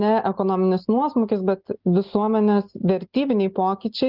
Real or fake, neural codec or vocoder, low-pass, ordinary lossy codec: real; none; 5.4 kHz; Opus, 32 kbps